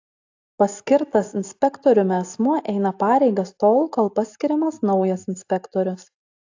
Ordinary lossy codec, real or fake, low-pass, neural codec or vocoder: AAC, 48 kbps; real; 7.2 kHz; none